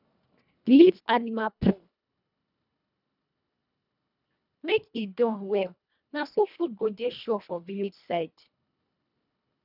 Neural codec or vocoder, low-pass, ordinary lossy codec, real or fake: codec, 24 kHz, 1.5 kbps, HILCodec; 5.4 kHz; none; fake